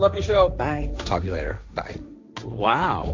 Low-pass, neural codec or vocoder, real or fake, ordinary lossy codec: 7.2 kHz; codec, 16 kHz, 1.1 kbps, Voila-Tokenizer; fake; MP3, 64 kbps